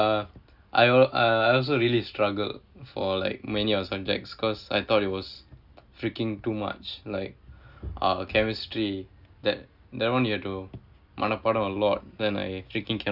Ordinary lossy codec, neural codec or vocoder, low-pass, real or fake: Opus, 64 kbps; none; 5.4 kHz; real